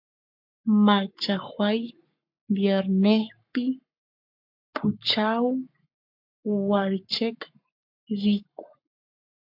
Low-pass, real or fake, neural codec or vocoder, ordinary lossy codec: 5.4 kHz; fake; codec, 44.1 kHz, 7.8 kbps, Pupu-Codec; MP3, 48 kbps